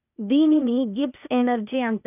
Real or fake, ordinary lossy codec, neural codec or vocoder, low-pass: fake; none; codec, 16 kHz, 0.8 kbps, ZipCodec; 3.6 kHz